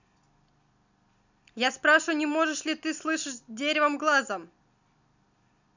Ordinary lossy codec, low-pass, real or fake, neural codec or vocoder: none; 7.2 kHz; real; none